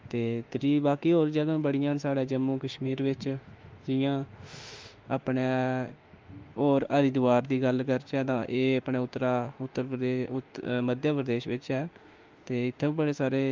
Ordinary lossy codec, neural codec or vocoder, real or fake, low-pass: Opus, 32 kbps; autoencoder, 48 kHz, 32 numbers a frame, DAC-VAE, trained on Japanese speech; fake; 7.2 kHz